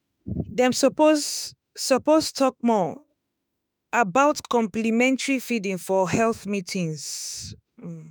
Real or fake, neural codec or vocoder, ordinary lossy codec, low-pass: fake; autoencoder, 48 kHz, 32 numbers a frame, DAC-VAE, trained on Japanese speech; none; none